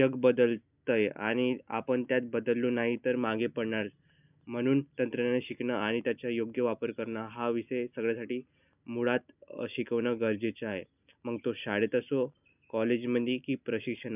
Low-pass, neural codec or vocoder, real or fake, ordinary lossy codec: 3.6 kHz; none; real; none